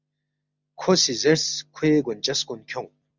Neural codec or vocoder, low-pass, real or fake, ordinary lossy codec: none; 7.2 kHz; real; Opus, 64 kbps